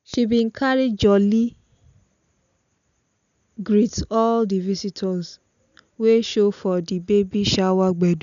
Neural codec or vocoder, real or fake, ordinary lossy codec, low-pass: none; real; none; 7.2 kHz